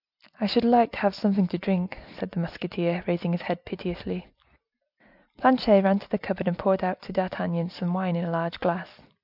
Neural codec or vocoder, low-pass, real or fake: none; 5.4 kHz; real